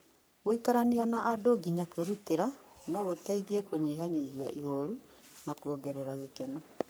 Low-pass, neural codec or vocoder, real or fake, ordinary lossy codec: none; codec, 44.1 kHz, 3.4 kbps, Pupu-Codec; fake; none